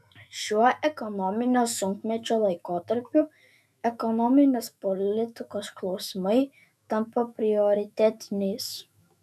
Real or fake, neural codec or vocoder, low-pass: fake; autoencoder, 48 kHz, 128 numbers a frame, DAC-VAE, trained on Japanese speech; 14.4 kHz